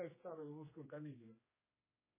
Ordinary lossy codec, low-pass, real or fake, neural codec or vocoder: MP3, 16 kbps; 3.6 kHz; fake; codec, 16 kHz, 2 kbps, X-Codec, HuBERT features, trained on general audio